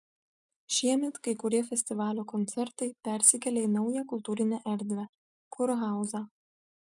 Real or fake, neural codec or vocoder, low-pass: real; none; 10.8 kHz